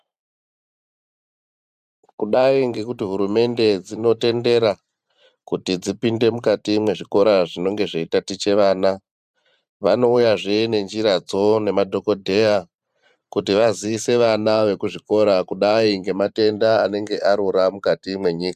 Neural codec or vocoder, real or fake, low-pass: vocoder, 44.1 kHz, 128 mel bands every 512 samples, BigVGAN v2; fake; 14.4 kHz